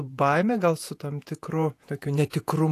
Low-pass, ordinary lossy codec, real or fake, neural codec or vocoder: 14.4 kHz; AAC, 64 kbps; fake; vocoder, 48 kHz, 128 mel bands, Vocos